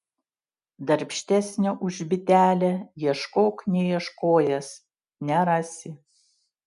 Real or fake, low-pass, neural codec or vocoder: real; 10.8 kHz; none